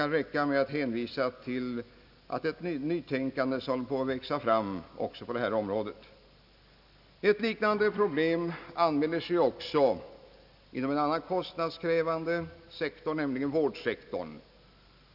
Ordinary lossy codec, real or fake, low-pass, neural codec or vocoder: none; real; 5.4 kHz; none